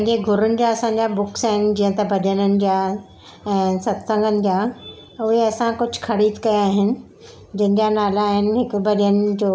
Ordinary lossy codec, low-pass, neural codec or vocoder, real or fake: none; none; none; real